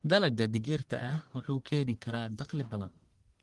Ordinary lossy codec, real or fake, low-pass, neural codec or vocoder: Opus, 24 kbps; fake; 10.8 kHz; codec, 44.1 kHz, 1.7 kbps, Pupu-Codec